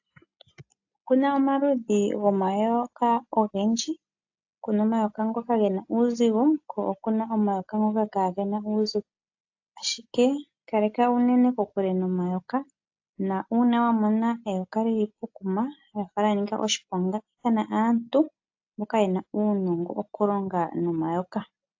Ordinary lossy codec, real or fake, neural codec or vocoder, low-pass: AAC, 48 kbps; real; none; 7.2 kHz